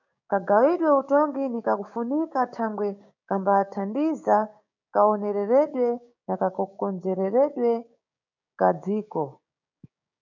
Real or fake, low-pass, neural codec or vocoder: fake; 7.2 kHz; codec, 16 kHz, 6 kbps, DAC